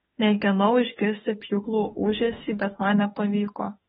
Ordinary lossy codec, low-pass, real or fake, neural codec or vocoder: AAC, 16 kbps; 19.8 kHz; fake; autoencoder, 48 kHz, 32 numbers a frame, DAC-VAE, trained on Japanese speech